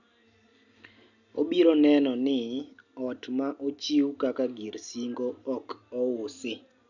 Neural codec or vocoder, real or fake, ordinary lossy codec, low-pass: none; real; none; 7.2 kHz